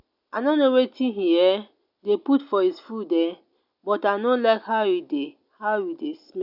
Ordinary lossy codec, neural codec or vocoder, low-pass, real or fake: none; none; 5.4 kHz; real